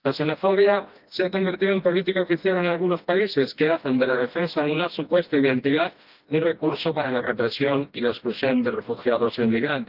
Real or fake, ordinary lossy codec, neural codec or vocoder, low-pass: fake; Opus, 32 kbps; codec, 16 kHz, 1 kbps, FreqCodec, smaller model; 5.4 kHz